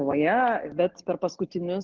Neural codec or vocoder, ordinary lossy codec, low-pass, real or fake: none; Opus, 16 kbps; 7.2 kHz; real